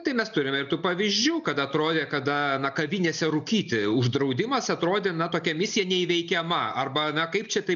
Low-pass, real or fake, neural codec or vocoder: 7.2 kHz; real; none